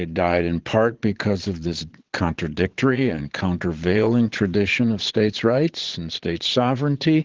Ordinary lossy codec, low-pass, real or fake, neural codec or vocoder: Opus, 16 kbps; 7.2 kHz; fake; vocoder, 22.05 kHz, 80 mel bands, Vocos